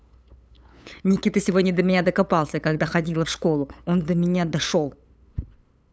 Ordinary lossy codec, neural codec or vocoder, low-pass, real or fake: none; codec, 16 kHz, 8 kbps, FunCodec, trained on LibriTTS, 25 frames a second; none; fake